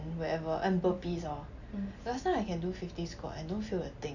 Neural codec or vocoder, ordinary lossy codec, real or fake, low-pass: none; none; real; 7.2 kHz